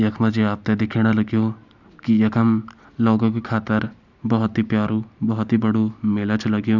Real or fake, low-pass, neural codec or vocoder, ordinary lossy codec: real; 7.2 kHz; none; none